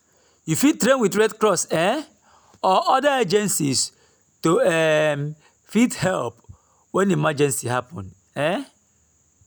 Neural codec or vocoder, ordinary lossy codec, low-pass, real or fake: none; none; none; real